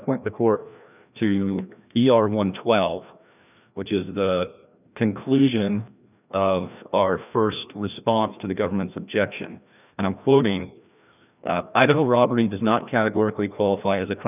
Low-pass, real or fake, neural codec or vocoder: 3.6 kHz; fake; codec, 16 kHz, 1 kbps, FreqCodec, larger model